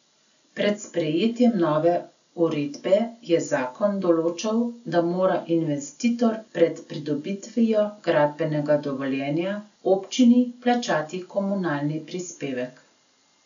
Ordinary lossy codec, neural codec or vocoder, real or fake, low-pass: none; none; real; 7.2 kHz